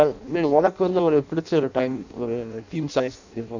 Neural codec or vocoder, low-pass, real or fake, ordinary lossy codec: codec, 16 kHz in and 24 kHz out, 0.6 kbps, FireRedTTS-2 codec; 7.2 kHz; fake; none